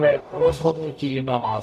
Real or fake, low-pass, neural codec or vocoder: fake; 14.4 kHz; codec, 44.1 kHz, 0.9 kbps, DAC